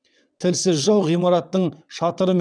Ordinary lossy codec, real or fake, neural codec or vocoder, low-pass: none; fake; vocoder, 22.05 kHz, 80 mel bands, WaveNeXt; 9.9 kHz